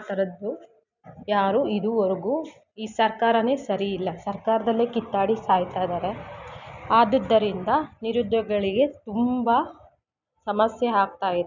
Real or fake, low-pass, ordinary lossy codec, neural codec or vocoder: real; 7.2 kHz; none; none